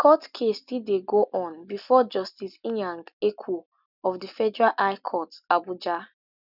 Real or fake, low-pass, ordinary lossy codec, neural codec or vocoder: real; 5.4 kHz; none; none